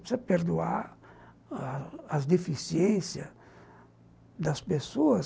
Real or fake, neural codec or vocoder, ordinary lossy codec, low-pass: real; none; none; none